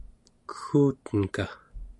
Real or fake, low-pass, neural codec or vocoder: real; 10.8 kHz; none